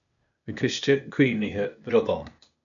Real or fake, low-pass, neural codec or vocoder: fake; 7.2 kHz; codec, 16 kHz, 0.8 kbps, ZipCodec